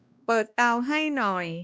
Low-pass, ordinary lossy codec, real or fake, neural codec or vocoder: none; none; fake; codec, 16 kHz, 1 kbps, X-Codec, WavLM features, trained on Multilingual LibriSpeech